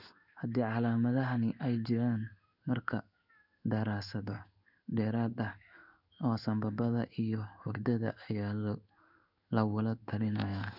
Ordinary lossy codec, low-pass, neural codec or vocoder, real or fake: none; 5.4 kHz; codec, 16 kHz in and 24 kHz out, 1 kbps, XY-Tokenizer; fake